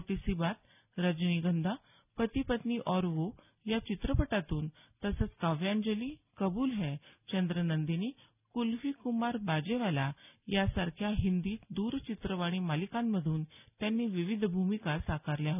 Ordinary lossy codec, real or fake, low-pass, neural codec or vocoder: none; real; 3.6 kHz; none